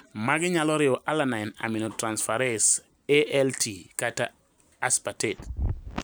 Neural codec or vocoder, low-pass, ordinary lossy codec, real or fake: none; none; none; real